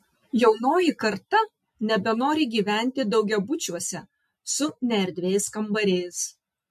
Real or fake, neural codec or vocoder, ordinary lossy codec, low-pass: real; none; MP3, 64 kbps; 14.4 kHz